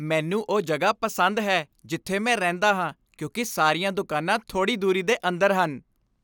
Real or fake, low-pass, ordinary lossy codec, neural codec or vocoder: real; none; none; none